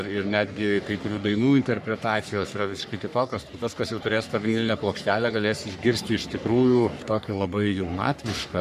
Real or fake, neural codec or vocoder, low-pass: fake; codec, 44.1 kHz, 3.4 kbps, Pupu-Codec; 14.4 kHz